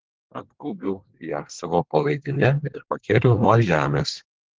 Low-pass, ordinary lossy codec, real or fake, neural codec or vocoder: 7.2 kHz; Opus, 16 kbps; fake; codec, 24 kHz, 1 kbps, SNAC